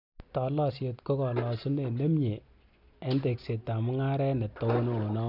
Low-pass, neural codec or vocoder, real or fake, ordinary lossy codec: 5.4 kHz; none; real; none